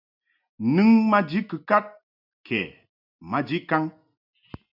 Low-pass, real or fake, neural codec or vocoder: 5.4 kHz; real; none